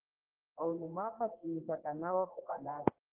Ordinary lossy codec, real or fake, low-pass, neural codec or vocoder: Opus, 16 kbps; fake; 3.6 kHz; codec, 44.1 kHz, 1.7 kbps, Pupu-Codec